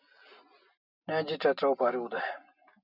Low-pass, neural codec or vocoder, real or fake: 5.4 kHz; none; real